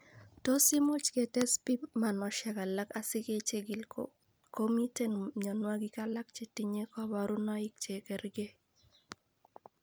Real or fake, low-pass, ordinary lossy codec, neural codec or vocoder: real; none; none; none